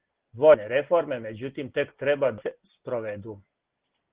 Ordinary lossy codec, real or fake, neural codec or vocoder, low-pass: Opus, 16 kbps; real; none; 3.6 kHz